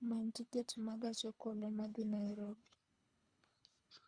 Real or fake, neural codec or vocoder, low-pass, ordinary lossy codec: fake; codec, 24 kHz, 3 kbps, HILCodec; 9.9 kHz; Opus, 64 kbps